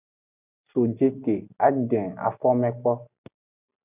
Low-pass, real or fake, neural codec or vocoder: 3.6 kHz; real; none